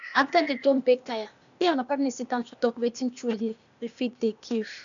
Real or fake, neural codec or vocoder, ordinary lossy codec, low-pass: fake; codec, 16 kHz, 0.8 kbps, ZipCodec; none; 7.2 kHz